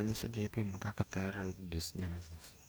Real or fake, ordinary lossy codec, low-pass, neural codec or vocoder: fake; none; none; codec, 44.1 kHz, 2.6 kbps, DAC